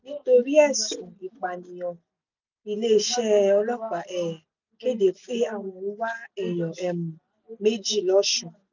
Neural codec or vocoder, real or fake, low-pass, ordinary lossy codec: none; real; 7.2 kHz; none